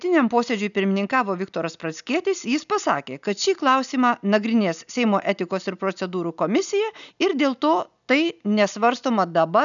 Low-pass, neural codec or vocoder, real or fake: 7.2 kHz; none; real